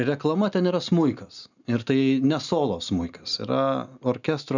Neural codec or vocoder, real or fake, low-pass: none; real; 7.2 kHz